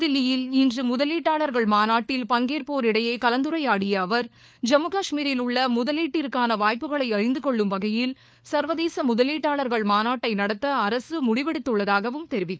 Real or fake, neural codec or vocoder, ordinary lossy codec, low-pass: fake; codec, 16 kHz, 4 kbps, FunCodec, trained on LibriTTS, 50 frames a second; none; none